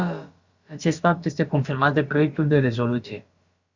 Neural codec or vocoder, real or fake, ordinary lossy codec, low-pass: codec, 16 kHz, about 1 kbps, DyCAST, with the encoder's durations; fake; Opus, 64 kbps; 7.2 kHz